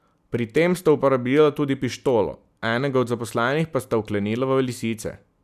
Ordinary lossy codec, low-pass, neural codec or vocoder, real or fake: none; 14.4 kHz; none; real